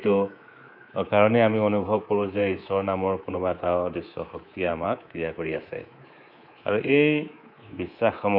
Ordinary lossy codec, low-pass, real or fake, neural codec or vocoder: none; 5.4 kHz; fake; codec, 24 kHz, 3.1 kbps, DualCodec